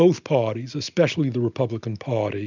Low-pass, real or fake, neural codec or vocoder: 7.2 kHz; real; none